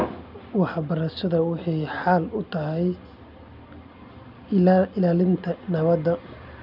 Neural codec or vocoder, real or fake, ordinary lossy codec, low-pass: none; real; none; 5.4 kHz